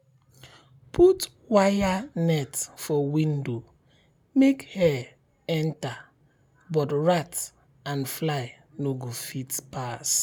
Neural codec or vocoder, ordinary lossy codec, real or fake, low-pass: none; none; real; none